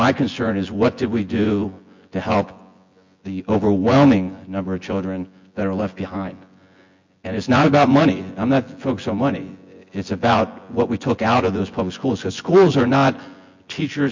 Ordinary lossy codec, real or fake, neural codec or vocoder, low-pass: MP3, 48 kbps; fake; vocoder, 24 kHz, 100 mel bands, Vocos; 7.2 kHz